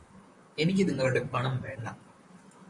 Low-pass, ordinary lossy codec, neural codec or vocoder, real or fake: 10.8 kHz; MP3, 48 kbps; vocoder, 44.1 kHz, 128 mel bands, Pupu-Vocoder; fake